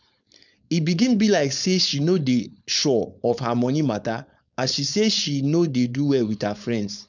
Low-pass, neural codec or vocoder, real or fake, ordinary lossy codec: 7.2 kHz; codec, 16 kHz, 4.8 kbps, FACodec; fake; none